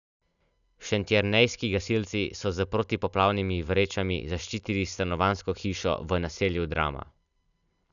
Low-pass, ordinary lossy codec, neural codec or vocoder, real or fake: 7.2 kHz; none; none; real